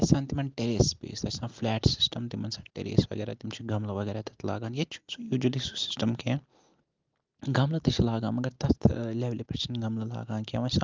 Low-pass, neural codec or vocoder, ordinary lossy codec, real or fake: 7.2 kHz; none; Opus, 32 kbps; real